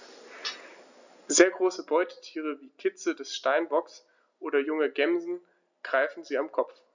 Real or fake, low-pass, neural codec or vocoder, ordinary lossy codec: real; 7.2 kHz; none; none